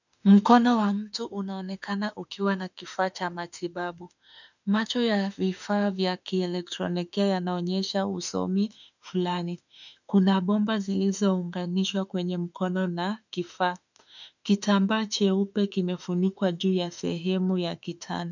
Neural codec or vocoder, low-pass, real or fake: autoencoder, 48 kHz, 32 numbers a frame, DAC-VAE, trained on Japanese speech; 7.2 kHz; fake